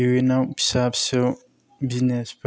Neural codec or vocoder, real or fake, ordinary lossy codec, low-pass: none; real; none; none